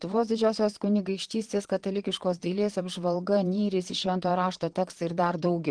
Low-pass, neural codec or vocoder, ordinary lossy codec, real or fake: 9.9 kHz; vocoder, 22.05 kHz, 80 mel bands, Vocos; Opus, 16 kbps; fake